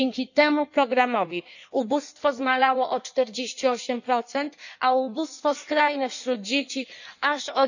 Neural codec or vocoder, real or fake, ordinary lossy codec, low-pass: codec, 16 kHz in and 24 kHz out, 1.1 kbps, FireRedTTS-2 codec; fake; none; 7.2 kHz